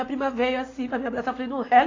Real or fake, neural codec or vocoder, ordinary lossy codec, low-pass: real; none; AAC, 32 kbps; 7.2 kHz